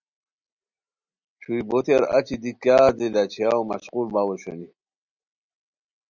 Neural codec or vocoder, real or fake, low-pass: none; real; 7.2 kHz